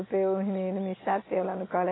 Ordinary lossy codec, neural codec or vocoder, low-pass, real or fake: AAC, 16 kbps; none; 7.2 kHz; real